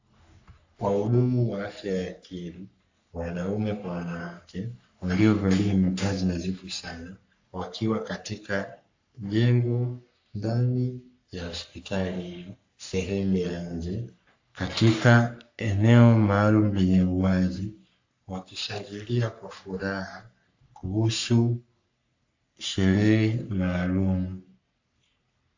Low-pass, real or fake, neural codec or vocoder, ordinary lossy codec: 7.2 kHz; fake; codec, 44.1 kHz, 3.4 kbps, Pupu-Codec; AAC, 48 kbps